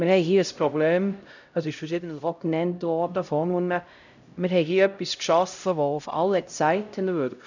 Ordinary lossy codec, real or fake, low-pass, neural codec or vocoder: none; fake; 7.2 kHz; codec, 16 kHz, 0.5 kbps, X-Codec, HuBERT features, trained on LibriSpeech